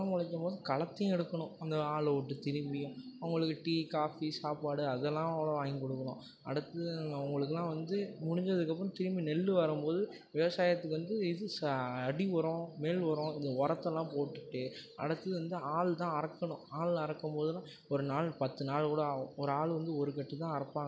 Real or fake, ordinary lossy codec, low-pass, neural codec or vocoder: real; none; none; none